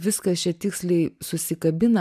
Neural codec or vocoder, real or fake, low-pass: none; real; 14.4 kHz